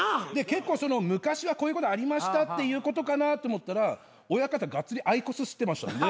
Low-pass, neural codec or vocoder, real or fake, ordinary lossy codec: none; none; real; none